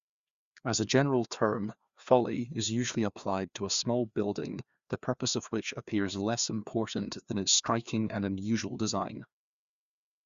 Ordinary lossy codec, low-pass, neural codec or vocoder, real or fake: Opus, 64 kbps; 7.2 kHz; codec, 16 kHz, 2 kbps, FreqCodec, larger model; fake